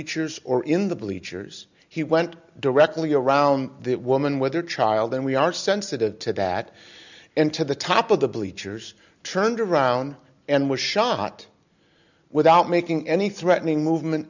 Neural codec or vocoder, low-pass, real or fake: none; 7.2 kHz; real